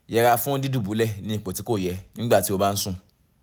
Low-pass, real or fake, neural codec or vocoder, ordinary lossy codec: none; real; none; none